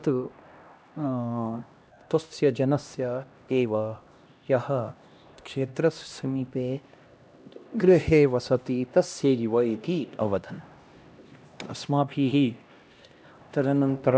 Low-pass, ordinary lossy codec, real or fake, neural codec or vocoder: none; none; fake; codec, 16 kHz, 1 kbps, X-Codec, HuBERT features, trained on LibriSpeech